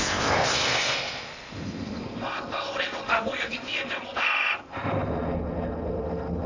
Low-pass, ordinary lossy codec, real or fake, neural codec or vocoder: 7.2 kHz; AAC, 48 kbps; fake; codec, 16 kHz in and 24 kHz out, 0.8 kbps, FocalCodec, streaming, 65536 codes